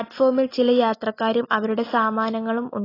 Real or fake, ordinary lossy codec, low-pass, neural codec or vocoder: real; AAC, 24 kbps; 5.4 kHz; none